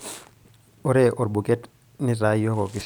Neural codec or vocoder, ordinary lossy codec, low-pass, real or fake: none; none; none; real